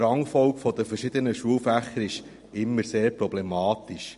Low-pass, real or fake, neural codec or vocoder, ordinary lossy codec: 14.4 kHz; real; none; MP3, 48 kbps